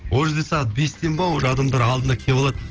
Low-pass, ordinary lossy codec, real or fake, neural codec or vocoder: 7.2 kHz; Opus, 16 kbps; real; none